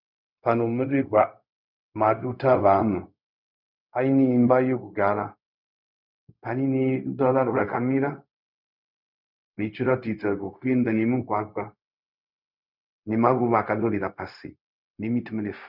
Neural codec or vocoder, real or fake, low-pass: codec, 16 kHz, 0.4 kbps, LongCat-Audio-Codec; fake; 5.4 kHz